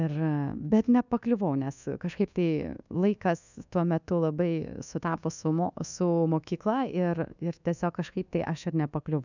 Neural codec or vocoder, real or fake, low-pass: codec, 24 kHz, 1.2 kbps, DualCodec; fake; 7.2 kHz